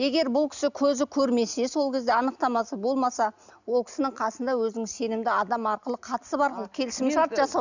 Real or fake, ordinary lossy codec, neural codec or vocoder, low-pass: real; none; none; 7.2 kHz